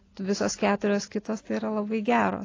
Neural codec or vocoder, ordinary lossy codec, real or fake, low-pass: none; AAC, 32 kbps; real; 7.2 kHz